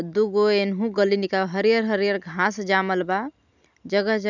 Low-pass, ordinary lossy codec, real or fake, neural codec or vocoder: 7.2 kHz; none; real; none